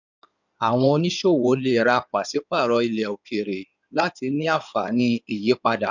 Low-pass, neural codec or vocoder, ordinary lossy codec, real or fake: 7.2 kHz; codec, 16 kHz in and 24 kHz out, 2.2 kbps, FireRedTTS-2 codec; none; fake